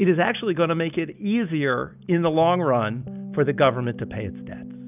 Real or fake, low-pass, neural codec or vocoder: real; 3.6 kHz; none